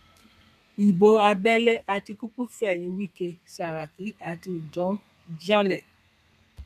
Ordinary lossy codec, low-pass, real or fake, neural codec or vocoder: none; 14.4 kHz; fake; codec, 32 kHz, 1.9 kbps, SNAC